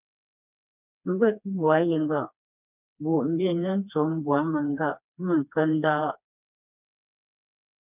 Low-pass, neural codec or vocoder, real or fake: 3.6 kHz; codec, 16 kHz, 2 kbps, FreqCodec, smaller model; fake